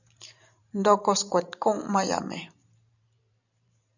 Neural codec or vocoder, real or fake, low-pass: vocoder, 44.1 kHz, 128 mel bands every 512 samples, BigVGAN v2; fake; 7.2 kHz